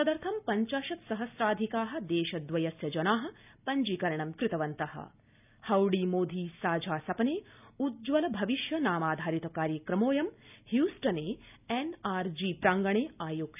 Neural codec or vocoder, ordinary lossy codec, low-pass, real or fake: none; none; 3.6 kHz; real